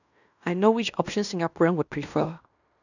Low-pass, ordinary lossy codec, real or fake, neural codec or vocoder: 7.2 kHz; none; fake; codec, 16 kHz in and 24 kHz out, 0.9 kbps, LongCat-Audio-Codec, fine tuned four codebook decoder